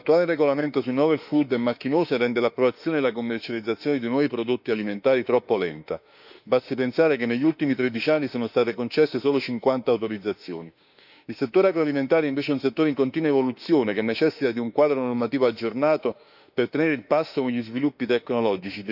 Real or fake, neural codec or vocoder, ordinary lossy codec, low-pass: fake; autoencoder, 48 kHz, 32 numbers a frame, DAC-VAE, trained on Japanese speech; none; 5.4 kHz